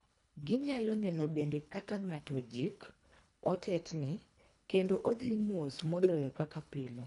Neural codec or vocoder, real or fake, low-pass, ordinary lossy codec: codec, 24 kHz, 1.5 kbps, HILCodec; fake; 10.8 kHz; none